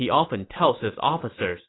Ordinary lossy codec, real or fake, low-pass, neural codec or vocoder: AAC, 16 kbps; real; 7.2 kHz; none